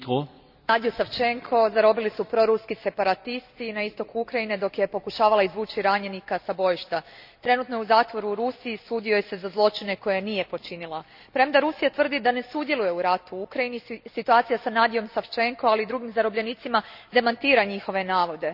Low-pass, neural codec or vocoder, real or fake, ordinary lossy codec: 5.4 kHz; none; real; none